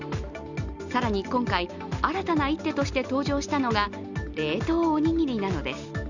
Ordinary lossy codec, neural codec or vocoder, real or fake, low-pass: Opus, 64 kbps; none; real; 7.2 kHz